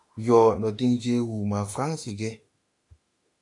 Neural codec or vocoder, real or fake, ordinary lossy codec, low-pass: autoencoder, 48 kHz, 32 numbers a frame, DAC-VAE, trained on Japanese speech; fake; AAC, 48 kbps; 10.8 kHz